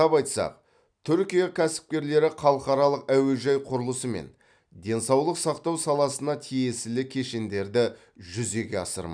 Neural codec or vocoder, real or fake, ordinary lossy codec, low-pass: none; real; none; 9.9 kHz